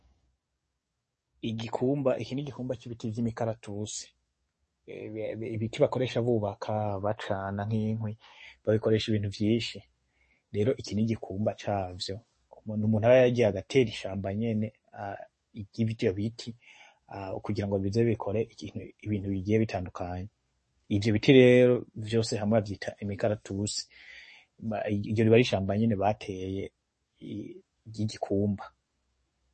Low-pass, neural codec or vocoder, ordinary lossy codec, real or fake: 10.8 kHz; codec, 44.1 kHz, 7.8 kbps, DAC; MP3, 32 kbps; fake